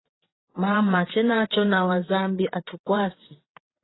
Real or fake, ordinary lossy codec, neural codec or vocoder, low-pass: fake; AAC, 16 kbps; vocoder, 22.05 kHz, 80 mel bands, WaveNeXt; 7.2 kHz